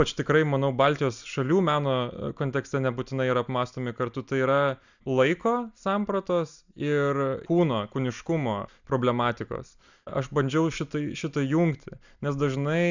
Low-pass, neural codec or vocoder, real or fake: 7.2 kHz; none; real